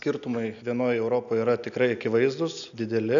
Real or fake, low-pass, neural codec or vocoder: real; 7.2 kHz; none